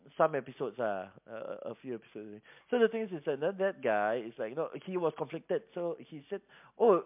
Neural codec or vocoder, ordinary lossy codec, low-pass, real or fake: none; MP3, 32 kbps; 3.6 kHz; real